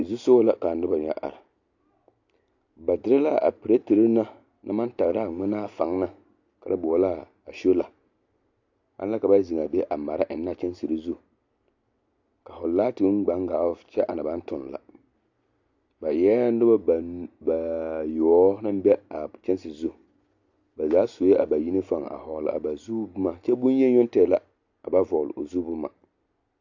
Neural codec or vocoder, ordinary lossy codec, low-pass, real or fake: none; AAC, 48 kbps; 7.2 kHz; real